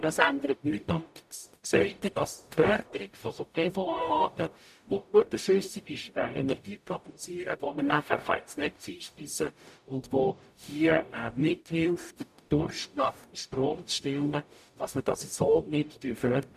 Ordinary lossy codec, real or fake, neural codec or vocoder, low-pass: none; fake; codec, 44.1 kHz, 0.9 kbps, DAC; 14.4 kHz